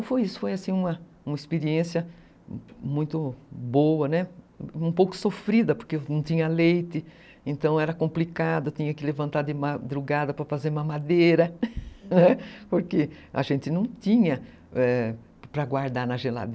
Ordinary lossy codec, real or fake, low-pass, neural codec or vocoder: none; real; none; none